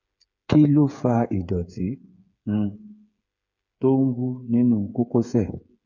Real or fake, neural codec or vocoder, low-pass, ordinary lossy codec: fake; codec, 16 kHz, 8 kbps, FreqCodec, smaller model; 7.2 kHz; none